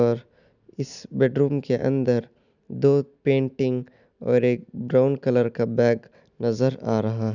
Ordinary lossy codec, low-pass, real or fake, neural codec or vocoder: none; 7.2 kHz; real; none